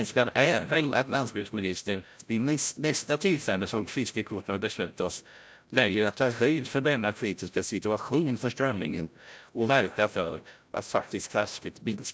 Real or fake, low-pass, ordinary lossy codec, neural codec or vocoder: fake; none; none; codec, 16 kHz, 0.5 kbps, FreqCodec, larger model